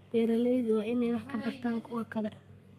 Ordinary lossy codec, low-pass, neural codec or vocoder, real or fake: none; 14.4 kHz; codec, 32 kHz, 1.9 kbps, SNAC; fake